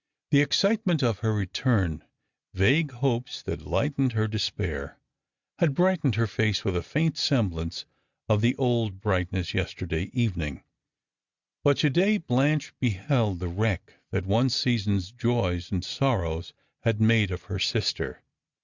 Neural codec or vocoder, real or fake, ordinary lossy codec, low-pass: none; real; Opus, 64 kbps; 7.2 kHz